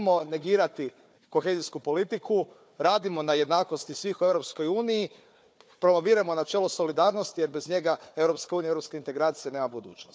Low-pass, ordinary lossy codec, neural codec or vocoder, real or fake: none; none; codec, 16 kHz, 4 kbps, FunCodec, trained on Chinese and English, 50 frames a second; fake